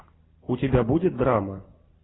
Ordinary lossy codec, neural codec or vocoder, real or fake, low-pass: AAC, 16 kbps; none; real; 7.2 kHz